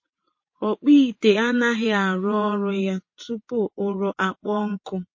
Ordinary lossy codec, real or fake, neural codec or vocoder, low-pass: MP3, 32 kbps; fake; vocoder, 22.05 kHz, 80 mel bands, WaveNeXt; 7.2 kHz